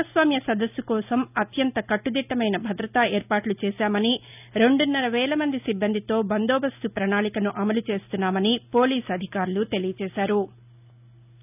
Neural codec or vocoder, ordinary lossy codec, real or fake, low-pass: none; none; real; 3.6 kHz